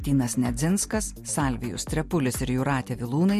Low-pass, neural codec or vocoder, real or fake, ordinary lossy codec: 14.4 kHz; none; real; MP3, 64 kbps